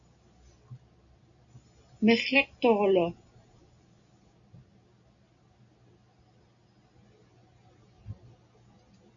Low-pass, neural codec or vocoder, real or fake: 7.2 kHz; none; real